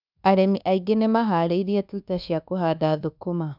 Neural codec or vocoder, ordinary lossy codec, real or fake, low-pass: codec, 24 kHz, 1.2 kbps, DualCodec; none; fake; 5.4 kHz